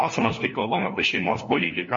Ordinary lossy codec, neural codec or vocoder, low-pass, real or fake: MP3, 32 kbps; codec, 16 kHz, 1 kbps, FunCodec, trained on LibriTTS, 50 frames a second; 7.2 kHz; fake